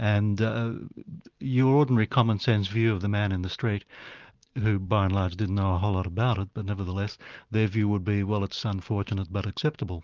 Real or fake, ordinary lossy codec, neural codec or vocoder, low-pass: real; Opus, 24 kbps; none; 7.2 kHz